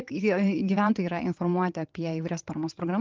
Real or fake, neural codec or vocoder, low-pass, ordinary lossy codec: fake; vocoder, 22.05 kHz, 80 mel bands, WaveNeXt; 7.2 kHz; Opus, 32 kbps